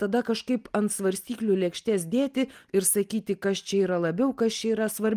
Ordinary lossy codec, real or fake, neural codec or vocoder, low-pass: Opus, 32 kbps; real; none; 14.4 kHz